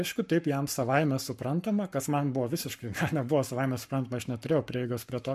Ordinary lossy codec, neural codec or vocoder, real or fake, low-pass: MP3, 64 kbps; codec, 44.1 kHz, 7.8 kbps, Pupu-Codec; fake; 14.4 kHz